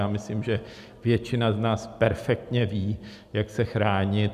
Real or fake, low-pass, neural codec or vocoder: real; 14.4 kHz; none